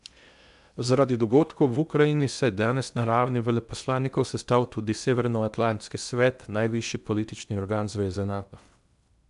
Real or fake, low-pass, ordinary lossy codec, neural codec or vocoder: fake; 10.8 kHz; none; codec, 16 kHz in and 24 kHz out, 0.8 kbps, FocalCodec, streaming, 65536 codes